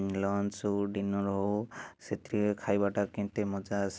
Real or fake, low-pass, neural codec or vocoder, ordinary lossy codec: real; none; none; none